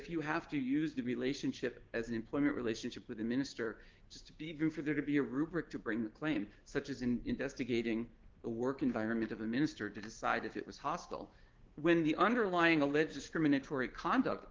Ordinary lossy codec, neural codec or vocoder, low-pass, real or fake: Opus, 16 kbps; codec, 16 kHz, 6 kbps, DAC; 7.2 kHz; fake